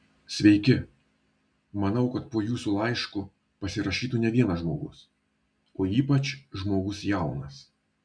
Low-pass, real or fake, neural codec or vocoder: 9.9 kHz; real; none